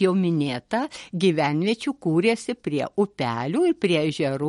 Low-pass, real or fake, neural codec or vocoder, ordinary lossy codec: 19.8 kHz; real; none; MP3, 48 kbps